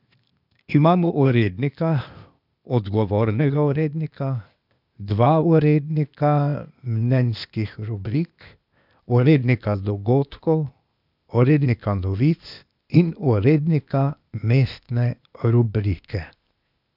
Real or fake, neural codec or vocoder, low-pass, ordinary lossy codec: fake; codec, 16 kHz, 0.8 kbps, ZipCodec; 5.4 kHz; none